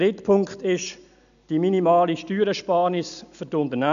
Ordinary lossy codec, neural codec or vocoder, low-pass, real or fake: none; none; 7.2 kHz; real